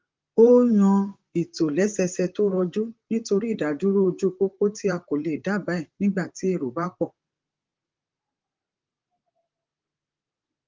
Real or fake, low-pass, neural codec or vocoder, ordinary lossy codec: fake; 7.2 kHz; vocoder, 44.1 kHz, 128 mel bands, Pupu-Vocoder; Opus, 32 kbps